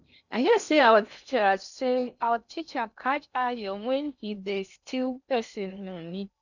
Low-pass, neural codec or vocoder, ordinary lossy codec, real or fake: 7.2 kHz; codec, 16 kHz in and 24 kHz out, 0.8 kbps, FocalCodec, streaming, 65536 codes; none; fake